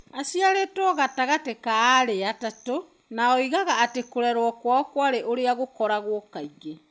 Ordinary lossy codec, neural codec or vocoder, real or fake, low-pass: none; none; real; none